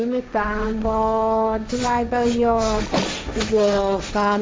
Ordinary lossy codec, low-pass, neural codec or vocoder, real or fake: none; none; codec, 16 kHz, 1.1 kbps, Voila-Tokenizer; fake